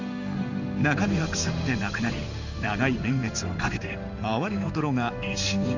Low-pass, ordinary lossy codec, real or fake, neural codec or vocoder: 7.2 kHz; none; fake; codec, 16 kHz in and 24 kHz out, 1 kbps, XY-Tokenizer